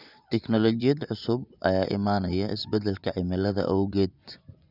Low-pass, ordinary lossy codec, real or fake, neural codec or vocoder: 5.4 kHz; none; fake; vocoder, 24 kHz, 100 mel bands, Vocos